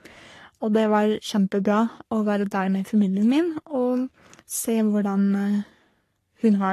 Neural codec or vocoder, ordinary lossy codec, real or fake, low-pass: codec, 44.1 kHz, 3.4 kbps, Pupu-Codec; AAC, 48 kbps; fake; 14.4 kHz